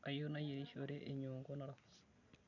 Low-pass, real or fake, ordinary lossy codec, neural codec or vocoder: 7.2 kHz; real; none; none